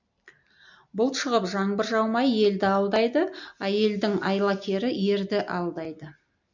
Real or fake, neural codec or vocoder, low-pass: real; none; 7.2 kHz